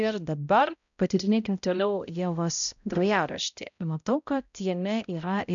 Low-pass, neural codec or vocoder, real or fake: 7.2 kHz; codec, 16 kHz, 0.5 kbps, X-Codec, HuBERT features, trained on balanced general audio; fake